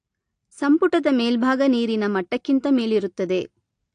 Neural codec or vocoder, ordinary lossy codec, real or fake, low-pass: none; AAC, 48 kbps; real; 9.9 kHz